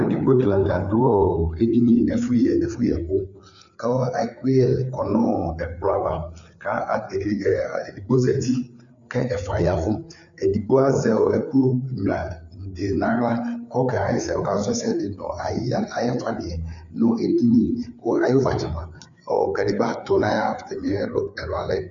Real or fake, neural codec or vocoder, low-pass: fake; codec, 16 kHz, 4 kbps, FreqCodec, larger model; 7.2 kHz